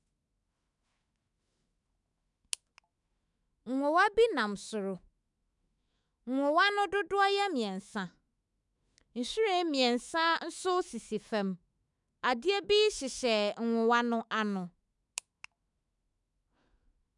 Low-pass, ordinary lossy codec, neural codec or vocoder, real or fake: 10.8 kHz; none; autoencoder, 48 kHz, 128 numbers a frame, DAC-VAE, trained on Japanese speech; fake